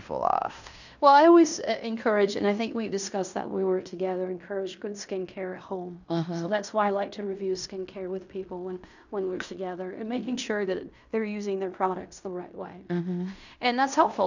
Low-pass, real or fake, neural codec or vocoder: 7.2 kHz; fake; codec, 16 kHz in and 24 kHz out, 0.9 kbps, LongCat-Audio-Codec, fine tuned four codebook decoder